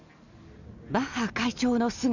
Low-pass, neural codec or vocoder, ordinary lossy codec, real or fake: 7.2 kHz; none; none; real